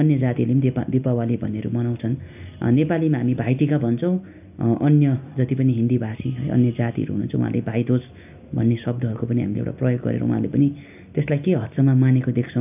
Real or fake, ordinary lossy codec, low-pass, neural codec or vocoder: real; AAC, 32 kbps; 3.6 kHz; none